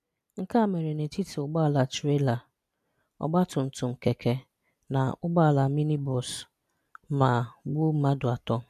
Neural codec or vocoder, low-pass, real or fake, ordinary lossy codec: none; 14.4 kHz; real; none